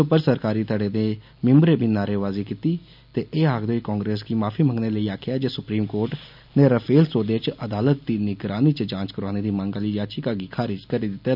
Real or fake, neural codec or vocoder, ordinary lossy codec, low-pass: real; none; none; 5.4 kHz